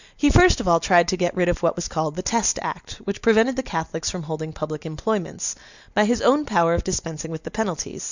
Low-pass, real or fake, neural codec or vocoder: 7.2 kHz; real; none